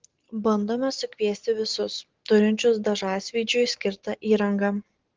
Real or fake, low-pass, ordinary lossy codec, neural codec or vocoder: real; 7.2 kHz; Opus, 16 kbps; none